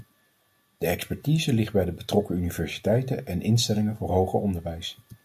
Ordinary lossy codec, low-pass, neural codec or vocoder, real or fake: MP3, 64 kbps; 14.4 kHz; none; real